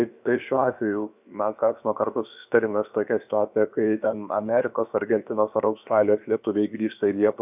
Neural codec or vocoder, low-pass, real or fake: codec, 16 kHz, about 1 kbps, DyCAST, with the encoder's durations; 3.6 kHz; fake